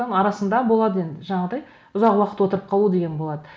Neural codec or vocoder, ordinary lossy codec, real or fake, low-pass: none; none; real; none